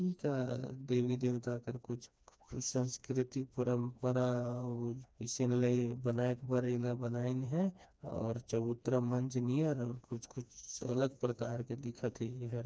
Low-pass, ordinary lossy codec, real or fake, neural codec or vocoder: none; none; fake; codec, 16 kHz, 2 kbps, FreqCodec, smaller model